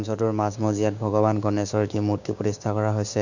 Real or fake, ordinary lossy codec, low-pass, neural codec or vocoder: fake; none; 7.2 kHz; codec, 16 kHz, 6 kbps, DAC